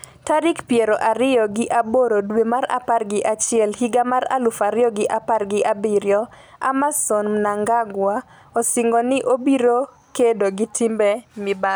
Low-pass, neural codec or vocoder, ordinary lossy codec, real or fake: none; none; none; real